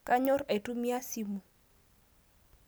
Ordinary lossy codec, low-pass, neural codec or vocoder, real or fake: none; none; none; real